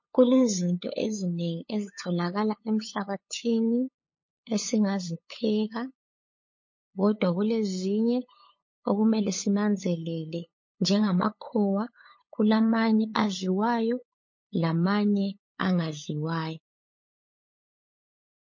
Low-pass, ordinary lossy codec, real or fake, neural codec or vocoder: 7.2 kHz; MP3, 32 kbps; fake; codec, 16 kHz, 8 kbps, FunCodec, trained on LibriTTS, 25 frames a second